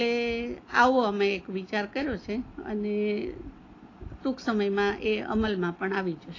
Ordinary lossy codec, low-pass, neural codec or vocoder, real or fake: AAC, 32 kbps; 7.2 kHz; none; real